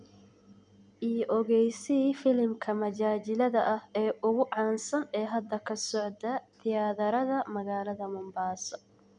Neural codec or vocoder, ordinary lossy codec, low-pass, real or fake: none; none; 9.9 kHz; real